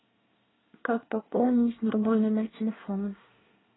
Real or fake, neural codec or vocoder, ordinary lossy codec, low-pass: fake; codec, 24 kHz, 1 kbps, SNAC; AAC, 16 kbps; 7.2 kHz